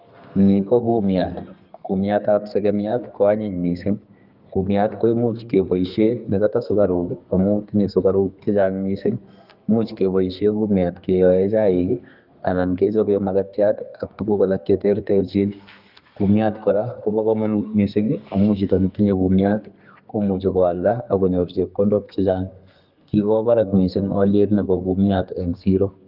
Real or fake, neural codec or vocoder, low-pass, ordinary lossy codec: fake; codec, 32 kHz, 1.9 kbps, SNAC; 5.4 kHz; Opus, 24 kbps